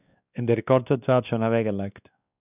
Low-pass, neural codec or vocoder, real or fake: 3.6 kHz; codec, 16 kHz, 2 kbps, X-Codec, WavLM features, trained on Multilingual LibriSpeech; fake